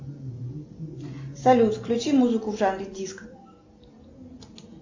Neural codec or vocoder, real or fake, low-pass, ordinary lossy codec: none; real; 7.2 kHz; AAC, 48 kbps